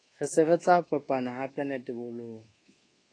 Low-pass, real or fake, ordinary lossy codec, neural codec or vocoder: 9.9 kHz; fake; AAC, 32 kbps; codec, 24 kHz, 1.2 kbps, DualCodec